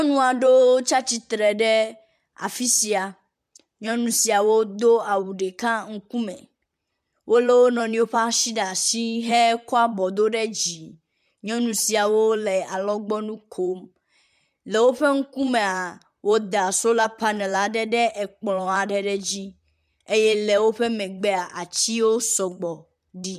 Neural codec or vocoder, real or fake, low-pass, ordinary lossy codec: vocoder, 44.1 kHz, 128 mel bands, Pupu-Vocoder; fake; 14.4 kHz; MP3, 96 kbps